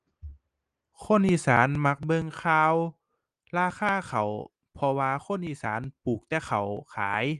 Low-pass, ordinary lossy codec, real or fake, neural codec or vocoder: 10.8 kHz; Opus, 24 kbps; real; none